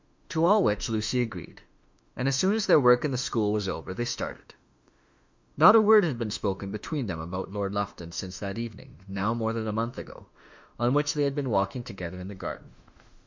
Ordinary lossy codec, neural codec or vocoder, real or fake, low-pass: MP3, 64 kbps; autoencoder, 48 kHz, 32 numbers a frame, DAC-VAE, trained on Japanese speech; fake; 7.2 kHz